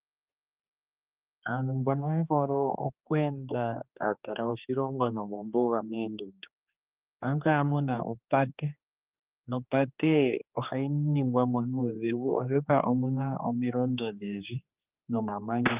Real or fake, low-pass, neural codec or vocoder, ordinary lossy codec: fake; 3.6 kHz; codec, 16 kHz, 2 kbps, X-Codec, HuBERT features, trained on general audio; Opus, 24 kbps